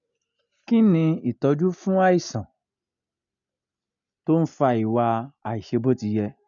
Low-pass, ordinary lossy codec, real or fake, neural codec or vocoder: 7.2 kHz; none; real; none